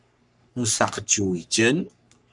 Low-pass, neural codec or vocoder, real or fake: 10.8 kHz; codec, 44.1 kHz, 3.4 kbps, Pupu-Codec; fake